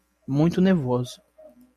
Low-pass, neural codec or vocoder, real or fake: 14.4 kHz; none; real